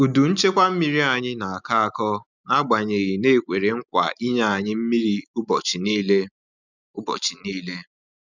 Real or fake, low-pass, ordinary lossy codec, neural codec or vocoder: real; 7.2 kHz; none; none